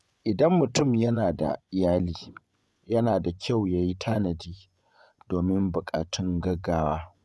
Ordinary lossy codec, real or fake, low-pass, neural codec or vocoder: none; fake; none; vocoder, 24 kHz, 100 mel bands, Vocos